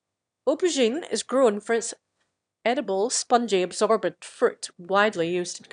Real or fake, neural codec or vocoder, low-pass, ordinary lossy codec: fake; autoencoder, 22.05 kHz, a latent of 192 numbers a frame, VITS, trained on one speaker; 9.9 kHz; none